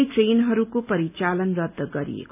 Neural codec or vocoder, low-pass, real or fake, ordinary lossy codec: none; 3.6 kHz; real; none